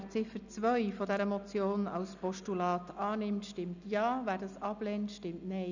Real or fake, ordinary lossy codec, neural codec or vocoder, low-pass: real; none; none; 7.2 kHz